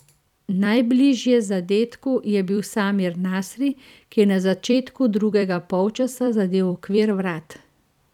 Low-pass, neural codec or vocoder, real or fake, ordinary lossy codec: 19.8 kHz; vocoder, 44.1 kHz, 128 mel bands every 256 samples, BigVGAN v2; fake; none